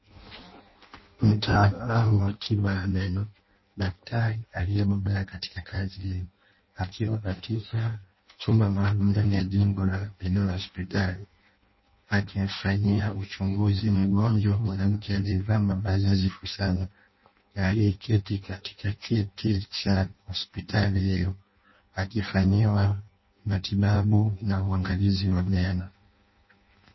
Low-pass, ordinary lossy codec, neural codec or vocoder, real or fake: 7.2 kHz; MP3, 24 kbps; codec, 16 kHz in and 24 kHz out, 0.6 kbps, FireRedTTS-2 codec; fake